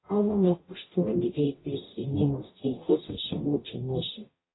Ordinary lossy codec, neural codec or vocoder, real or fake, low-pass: AAC, 16 kbps; codec, 44.1 kHz, 0.9 kbps, DAC; fake; 7.2 kHz